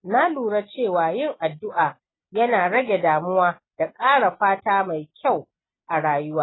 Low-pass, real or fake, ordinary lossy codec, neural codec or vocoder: 7.2 kHz; real; AAC, 16 kbps; none